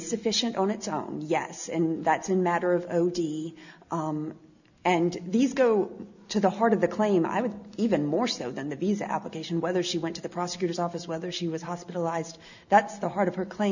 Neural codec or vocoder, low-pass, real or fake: none; 7.2 kHz; real